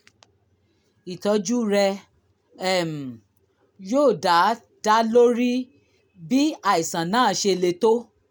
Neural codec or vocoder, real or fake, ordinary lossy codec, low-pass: none; real; none; none